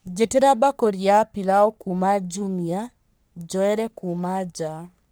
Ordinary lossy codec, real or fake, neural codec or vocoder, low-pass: none; fake; codec, 44.1 kHz, 3.4 kbps, Pupu-Codec; none